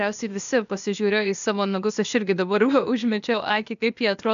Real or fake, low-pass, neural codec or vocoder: fake; 7.2 kHz; codec, 16 kHz, 0.8 kbps, ZipCodec